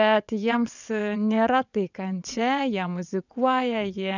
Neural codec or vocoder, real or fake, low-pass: vocoder, 22.05 kHz, 80 mel bands, WaveNeXt; fake; 7.2 kHz